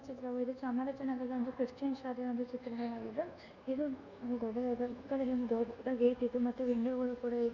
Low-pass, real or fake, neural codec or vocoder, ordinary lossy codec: 7.2 kHz; fake; codec, 24 kHz, 1.2 kbps, DualCodec; none